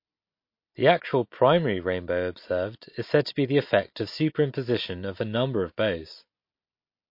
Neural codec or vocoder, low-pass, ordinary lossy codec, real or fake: none; 5.4 kHz; MP3, 32 kbps; real